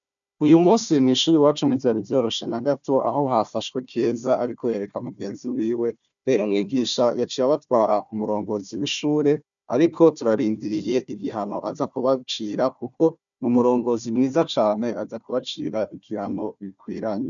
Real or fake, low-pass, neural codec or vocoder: fake; 7.2 kHz; codec, 16 kHz, 1 kbps, FunCodec, trained on Chinese and English, 50 frames a second